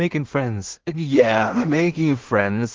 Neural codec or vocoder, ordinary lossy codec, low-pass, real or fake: codec, 16 kHz in and 24 kHz out, 0.4 kbps, LongCat-Audio-Codec, two codebook decoder; Opus, 16 kbps; 7.2 kHz; fake